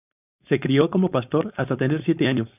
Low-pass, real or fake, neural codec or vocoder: 3.6 kHz; fake; codec, 16 kHz, 4.8 kbps, FACodec